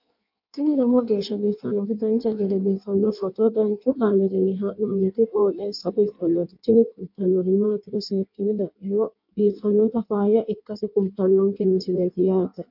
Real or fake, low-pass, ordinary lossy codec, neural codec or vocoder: fake; 5.4 kHz; MP3, 48 kbps; codec, 16 kHz in and 24 kHz out, 1.1 kbps, FireRedTTS-2 codec